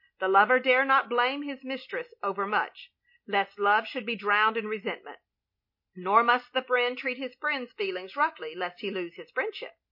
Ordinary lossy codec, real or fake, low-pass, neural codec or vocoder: MP3, 32 kbps; real; 5.4 kHz; none